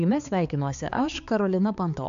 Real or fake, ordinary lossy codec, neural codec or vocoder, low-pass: fake; AAC, 64 kbps; codec, 16 kHz, 4 kbps, X-Codec, HuBERT features, trained on balanced general audio; 7.2 kHz